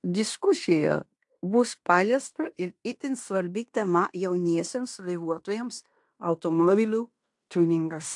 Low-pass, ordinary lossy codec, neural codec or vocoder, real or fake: 10.8 kHz; AAC, 64 kbps; codec, 16 kHz in and 24 kHz out, 0.9 kbps, LongCat-Audio-Codec, fine tuned four codebook decoder; fake